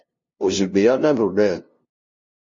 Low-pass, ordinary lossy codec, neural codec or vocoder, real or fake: 7.2 kHz; MP3, 32 kbps; codec, 16 kHz, 0.5 kbps, FunCodec, trained on LibriTTS, 25 frames a second; fake